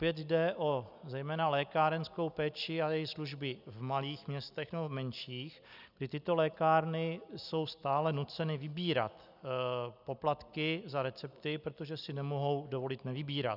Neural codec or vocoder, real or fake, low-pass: none; real; 5.4 kHz